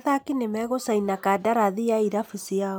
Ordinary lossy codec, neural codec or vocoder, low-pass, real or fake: none; none; none; real